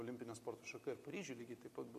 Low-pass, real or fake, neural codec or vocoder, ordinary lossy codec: 14.4 kHz; real; none; MP3, 64 kbps